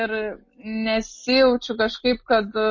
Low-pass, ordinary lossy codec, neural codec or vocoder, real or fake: 7.2 kHz; MP3, 32 kbps; none; real